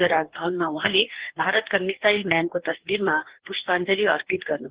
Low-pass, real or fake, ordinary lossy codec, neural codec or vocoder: 3.6 kHz; fake; Opus, 16 kbps; codec, 44.1 kHz, 2.6 kbps, DAC